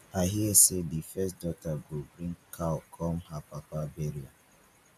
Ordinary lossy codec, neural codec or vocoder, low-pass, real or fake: none; none; 14.4 kHz; real